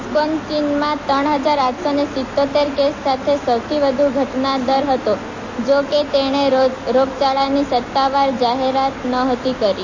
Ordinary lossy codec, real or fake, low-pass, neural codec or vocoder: MP3, 32 kbps; real; 7.2 kHz; none